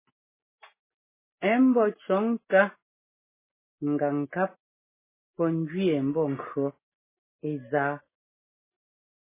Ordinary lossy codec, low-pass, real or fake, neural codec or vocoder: MP3, 16 kbps; 3.6 kHz; real; none